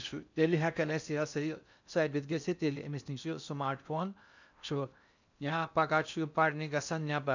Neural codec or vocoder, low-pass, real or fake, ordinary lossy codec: codec, 16 kHz in and 24 kHz out, 0.6 kbps, FocalCodec, streaming, 4096 codes; 7.2 kHz; fake; none